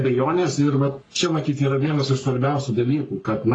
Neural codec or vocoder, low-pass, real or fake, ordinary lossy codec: codec, 44.1 kHz, 3.4 kbps, Pupu-Codec; 9.9 kHz; fake; AAC, 32 kbps